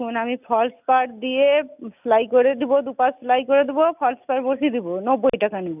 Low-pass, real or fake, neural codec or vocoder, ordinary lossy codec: 3.6 kHz; real; none; none